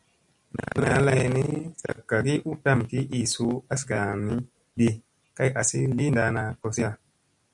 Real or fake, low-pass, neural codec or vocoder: real; 10.8 kHz; none